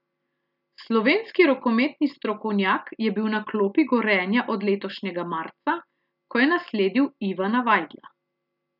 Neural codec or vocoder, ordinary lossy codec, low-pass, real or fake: none; none; 5.4 kHz; real